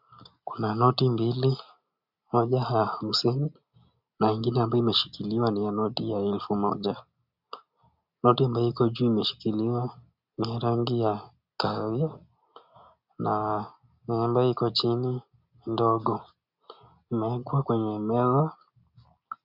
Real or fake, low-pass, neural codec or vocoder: real; 5.4 kHz; none